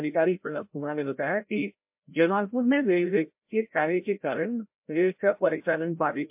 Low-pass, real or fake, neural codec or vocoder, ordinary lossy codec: 3.6 kHz; fake; codec, 16 kHz, 0.5 kbps, FreqCodec, larger model; MP3, 32 kbps